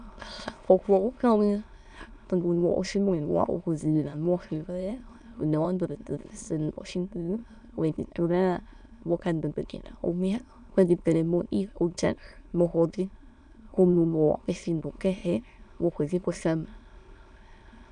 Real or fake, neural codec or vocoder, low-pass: fake; autoencoder, 22.05 kHz, a latent of 192 numbers a frame, VITS, trained on many speakers; 9.9 kHz